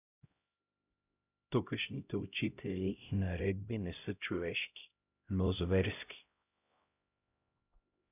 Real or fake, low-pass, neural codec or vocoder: fake; 3.6 kHz; codec, 16 kHz, 0.5 kbps, X-Codec, HuBERT features, trained on LibriSpeech